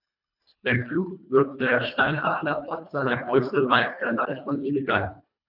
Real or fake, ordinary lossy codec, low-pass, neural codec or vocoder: fake; none; 5.4 kHz; codec, 24 kHz, 1.5 kbps, HILCodec